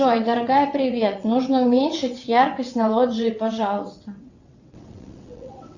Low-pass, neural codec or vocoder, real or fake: 7.2 kHz; vocoder, 22.05 kHz, 80 mel bands, WaveNeXt; fake